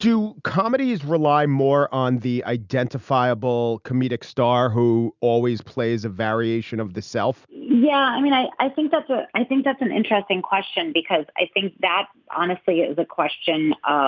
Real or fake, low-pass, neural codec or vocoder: real; 7.2 kHz; none